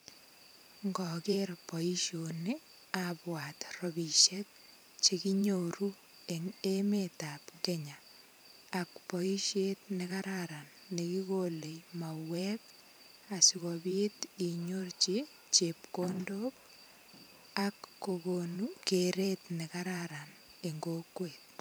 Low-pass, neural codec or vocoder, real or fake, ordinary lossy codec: none; vocoder, 44.1 kHz, 128 mel bands every 512 samples, BigVGAN v2; fake; none